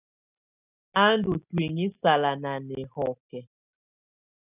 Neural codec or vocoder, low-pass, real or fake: none; 3.6 kHz; real